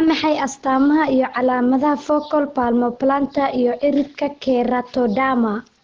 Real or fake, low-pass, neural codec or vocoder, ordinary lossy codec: real; 7.2 kHz; none; Opus, 16 kbps